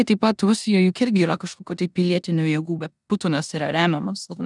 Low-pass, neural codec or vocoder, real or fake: 10.8 kHz; codec, 16 kHz in and 24 kHz out, 0.9 kbps, LongCat-Audio-Codec, four codebook decoder; fake